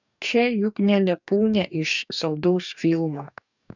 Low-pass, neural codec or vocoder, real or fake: 7.2 kHz; codec, 44.1 kHz, 2.6 kbps, DAC; fake